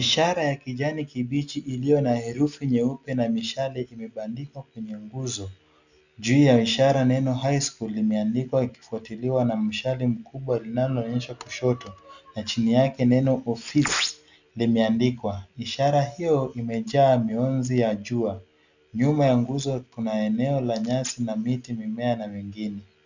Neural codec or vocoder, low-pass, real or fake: none; 7.2 kHz; real